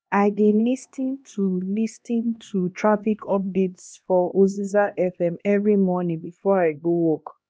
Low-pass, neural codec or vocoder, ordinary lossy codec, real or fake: none; codec, 16 kHz, 1 kbps, X-Codec, HuBERT features, trained on LibriSpeech; none; fake